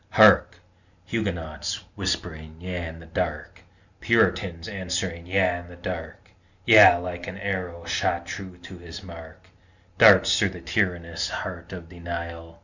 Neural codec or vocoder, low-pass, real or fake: none; 7.2 kHz; real